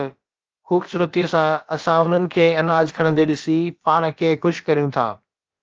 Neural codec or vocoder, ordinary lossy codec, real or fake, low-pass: codec, 16 kHz, about 1 kbps, DyCAST, with the encoder's durations; Opus, 24 kbps; fake; 7.2 kHz